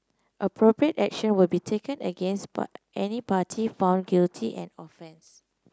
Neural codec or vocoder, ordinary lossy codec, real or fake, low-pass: none; none; real; none